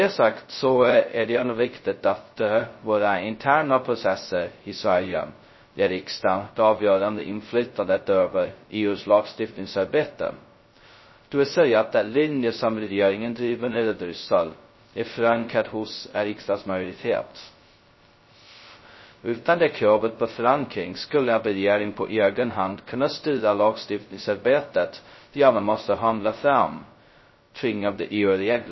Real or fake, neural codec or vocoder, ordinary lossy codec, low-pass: fake; codec, 16 kHz, 0.2 kbps, FocalCodec; MP3, 24 kbps; 7.2 kHz